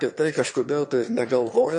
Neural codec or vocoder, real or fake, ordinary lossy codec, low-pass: autoencoder, 22.05 kHz, a latent of 192 numbers a frame, VITS, trained on one speaker; fake; MP3, 48 kbps; 9.9 kHz